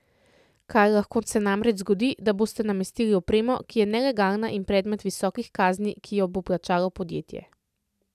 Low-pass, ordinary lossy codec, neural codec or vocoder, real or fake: 14.4 kHz; none; none; real